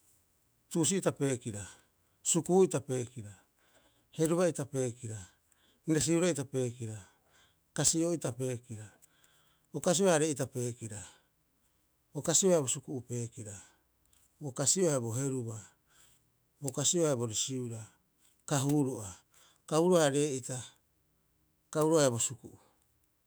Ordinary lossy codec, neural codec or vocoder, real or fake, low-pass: none; autoencoder, 48 kHz, 128 numbers a frame, DAC-VAE, trained on Japanese speech; fake; none